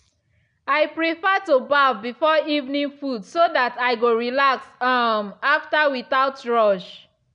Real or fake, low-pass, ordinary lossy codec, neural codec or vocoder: real; 9.9 kHz; none; none